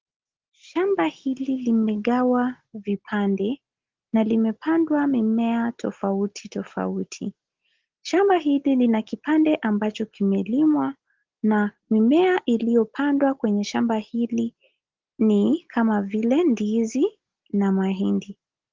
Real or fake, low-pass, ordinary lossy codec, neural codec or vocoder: real; 7.2 kHz; Opus, 16 kbps; none